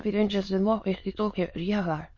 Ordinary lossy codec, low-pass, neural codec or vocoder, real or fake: MP3, 32 kbps; 7.2 kHz; autoencoder, 22.05 kHz, a latent of 192 numbers a frame, VITS, trained on many speakers; fake